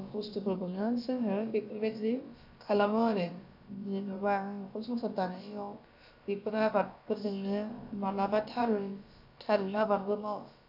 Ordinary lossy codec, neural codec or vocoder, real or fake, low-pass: none; codec, 16 kHz, about 1 kbps, DyCAST, with the encoder's durations; fake; 5.4 kHz